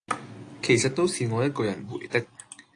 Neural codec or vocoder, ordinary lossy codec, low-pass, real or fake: none; AAC, 48 kbps; 9.9 kHz; real